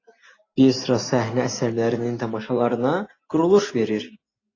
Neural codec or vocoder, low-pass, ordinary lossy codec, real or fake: none; 7.2 kHz; AAC, 32 kbps; real